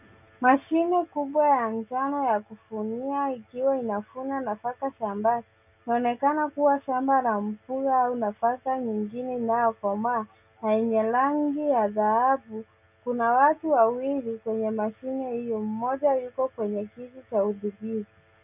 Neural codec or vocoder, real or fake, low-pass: none; real; 3.6 kHz